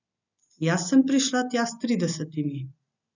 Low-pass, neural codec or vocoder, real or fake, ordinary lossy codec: 7.2 kHz; none; real; none